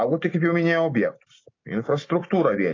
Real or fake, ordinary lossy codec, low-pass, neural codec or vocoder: real; AAC, 32 kbps; 7.2 kHz; none